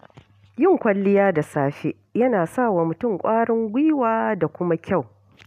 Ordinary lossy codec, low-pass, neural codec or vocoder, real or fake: none; 14.4 kHz; none; real